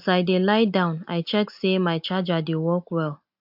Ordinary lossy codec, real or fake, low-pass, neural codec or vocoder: AAC, 48 kbps; real; 5.4 kHz; none